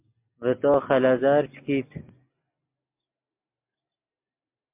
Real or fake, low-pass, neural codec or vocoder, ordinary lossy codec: real; 3.6 kHz; none; MP3, 32 kbps